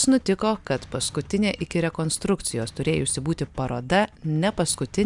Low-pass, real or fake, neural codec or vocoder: 10.8 kHz; real; none